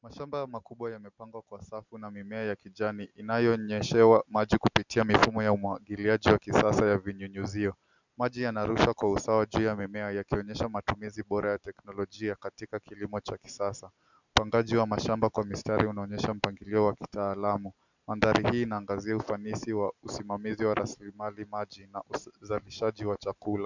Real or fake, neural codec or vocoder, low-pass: real; none; 7.2 kHz